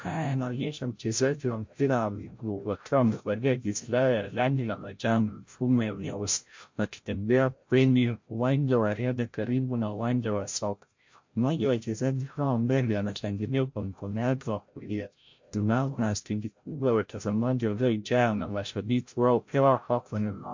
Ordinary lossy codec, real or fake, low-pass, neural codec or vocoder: MP3, 48 kbps; fake; 7.2 kHz; codec, 16 kHz, 0.5 kbps, FreqCodec, larger model